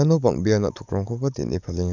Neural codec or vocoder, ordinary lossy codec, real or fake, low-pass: codec, 44.1 kHz, 7.8 kbps, DAC; none; fake; 7.2 kHz